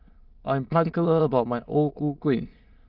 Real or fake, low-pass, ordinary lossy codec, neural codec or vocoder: fake; 5.4 kHz; Opus, 32 kbps; autoencoder, 22.05 kHz, a latent of 192 numbers a frame, VITS, trained on many speakers